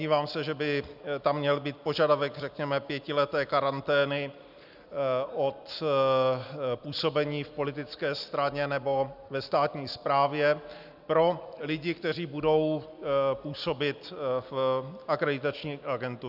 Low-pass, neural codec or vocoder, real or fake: 5.4 kHz; none; real